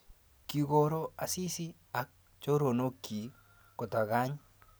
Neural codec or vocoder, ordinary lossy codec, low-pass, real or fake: none; none; none; real